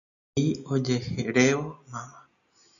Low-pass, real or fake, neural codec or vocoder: 7.2 kHz; real; none